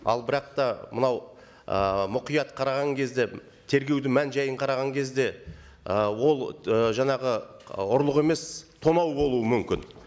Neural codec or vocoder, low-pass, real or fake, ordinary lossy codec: none; none; real; none